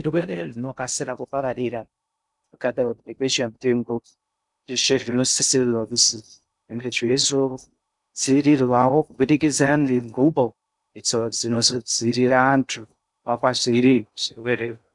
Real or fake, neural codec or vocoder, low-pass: fake; codec, 16 kHz in and 24 kHz out, 0.6 kbps, FocalCodec, streaming, 2048 codes; 10.8 kHz